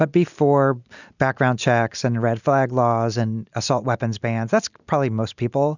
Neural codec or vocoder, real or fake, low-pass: none; real; 7.2 kHz